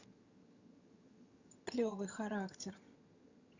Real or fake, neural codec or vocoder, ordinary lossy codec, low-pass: fake; vocoder, 22.05 kHz, 80 mel bands, HiFi-GAN; Opus, 64 kbps; 7.2 kHz